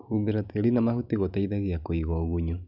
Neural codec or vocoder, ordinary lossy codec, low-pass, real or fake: none; none; 5.4 kHz; real